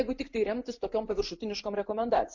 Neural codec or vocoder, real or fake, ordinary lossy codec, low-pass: none; real; MP3, 48 kbps; 7.2 kHz